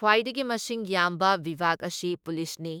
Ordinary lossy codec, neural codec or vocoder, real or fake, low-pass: none; autoencoder, 48 kHz, 32 numbers a frame, DAC-VAE, trained on Japanese speech; fake; none